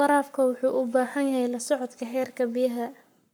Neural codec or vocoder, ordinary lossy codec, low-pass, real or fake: codec, 44.1 kHz, 7.8 kbps, Pupu-Codec; none; none; fake